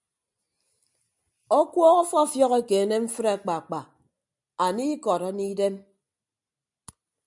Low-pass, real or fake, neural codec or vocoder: 10.8 kHz; real; none